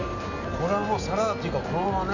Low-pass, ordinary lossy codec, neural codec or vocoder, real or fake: 7.2 kHz; none; none; real